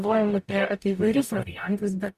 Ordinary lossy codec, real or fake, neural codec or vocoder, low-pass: Opus, 64 kbps; fake; codec, 44.1 kHz, 0.9 kbps, DAC; 14.4 kHz